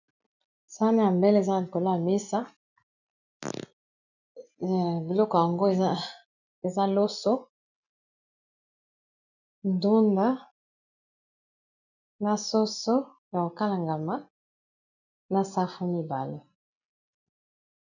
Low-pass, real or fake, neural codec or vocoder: 7.2 kHz; real; none